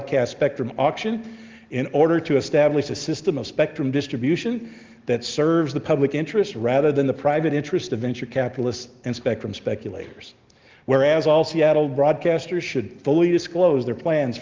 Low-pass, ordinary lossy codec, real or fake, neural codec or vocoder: 7.2 kHz; Opus, 32 kbps; real; none